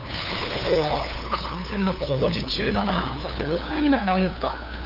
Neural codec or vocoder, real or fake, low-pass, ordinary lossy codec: codec, 16 kHz, 2 kbps, FunCodec, trained on LibriTTS, 25 frames a second; fake; 5.4 kHz; none